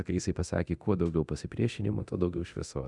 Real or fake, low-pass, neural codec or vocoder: fake; 10.8 kHz; codec, 24 kHz, 0.9 kbps, DualCodec